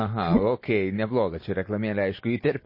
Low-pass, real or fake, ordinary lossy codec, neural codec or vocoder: 5.4 kHz; real; MP3, 24 kbps; none